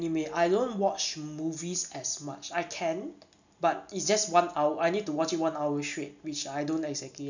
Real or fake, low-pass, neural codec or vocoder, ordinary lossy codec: real; 7.2 kHz; none; none